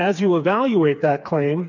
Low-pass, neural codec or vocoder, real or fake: 7.2 kHz; codec, 16 kHz, 4 kbps, FreqCodec, smaller model; fake